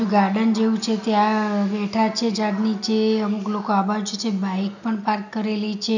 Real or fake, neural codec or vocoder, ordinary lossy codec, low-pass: real; none; none; 7.2 kHz